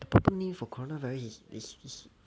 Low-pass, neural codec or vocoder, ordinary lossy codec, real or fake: none; none; none; real